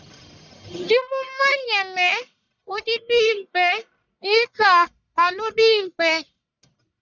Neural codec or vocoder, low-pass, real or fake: codec, 44.1 kHz, 1.7 kbps, Pupu-Codec; 7.2 kHz; fake